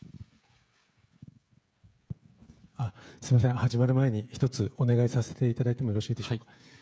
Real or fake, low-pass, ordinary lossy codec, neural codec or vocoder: fake; none; none; codec, 16 kHz, 16 kbps, FreqCodec, smaller model